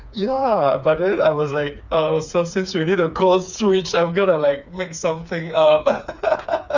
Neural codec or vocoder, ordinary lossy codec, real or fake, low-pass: codec, 16 kHz, 4 kbps, FreqCodec, smaller model; none; fake; 7.2 kHz